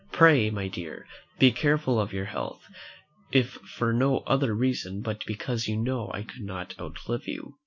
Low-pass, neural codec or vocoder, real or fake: 7.2 kHz; none; real